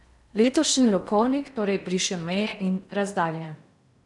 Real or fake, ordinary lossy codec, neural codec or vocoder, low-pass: fake; none; codec, 16 kHz in and 24 kHz out, 0.6 kbps, FocalCodec, streaming, 2048 codes; 10.8 kHz